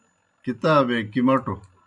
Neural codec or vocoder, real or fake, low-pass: none; real; 10.8 kHz